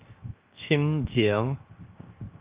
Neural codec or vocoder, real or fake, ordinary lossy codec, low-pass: codec, 16 kHz, 0.7 kbps, FocalCodec; fake; Opus, 24 kbps; 3.6 kHz